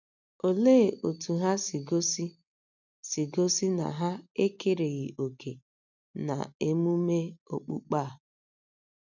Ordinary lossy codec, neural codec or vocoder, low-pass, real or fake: none; none; 7.2 kHz; real